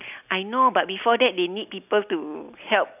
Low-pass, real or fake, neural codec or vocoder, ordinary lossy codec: 3.6 kHz; real; none; none